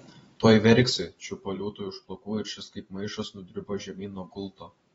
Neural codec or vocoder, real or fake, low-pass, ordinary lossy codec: vocoder, 44.1 kHz, 128 mel bands every 512 samples, BigVGAN v2; fake; 19.8 kHz; AAC, 24 kbps